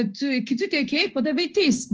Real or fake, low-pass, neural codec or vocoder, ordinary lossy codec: fake; 7.2 kHz; codec, 16 kHz in and 24 kHz out, 1 kbps, XY-Tokenizer; Opus, 24 kbps